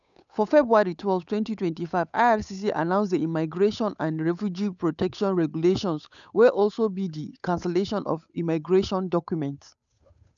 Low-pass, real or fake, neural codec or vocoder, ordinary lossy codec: 7.2 kHz; fake; codec, 16 kHz, 8 kbps, FunCodec, trained on Chinese and English, 25 frames a second; none